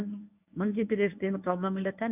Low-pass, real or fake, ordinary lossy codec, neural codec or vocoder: 3.6 kHz; fake; none; codec, 24 kHz, 0.9 kbps, WavTokenizer, medium speech release version 1